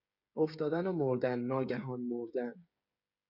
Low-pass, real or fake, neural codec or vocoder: 5.4 kHz; fake; codec, 16 kHz, 8 kbps, FreqCodec, smaller model